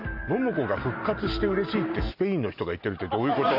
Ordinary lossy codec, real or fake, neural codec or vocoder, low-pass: none; real; none; 5.4 kHz